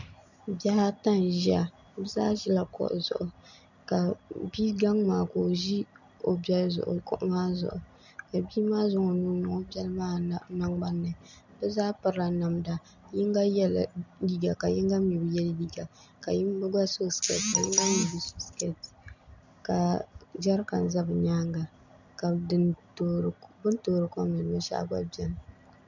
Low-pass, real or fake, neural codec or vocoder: 7.2 kHz; real; none